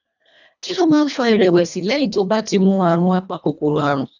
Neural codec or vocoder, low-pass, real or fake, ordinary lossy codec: codec, 24 kHz, 1.5 kbps, HILCodec; 7.2 kHz; fake; none